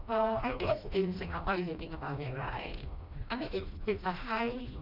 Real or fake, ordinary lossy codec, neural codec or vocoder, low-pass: fake; none; codec, 16 kHz, 1 kbps, FreqCodec, smaller model; 5.4 kHz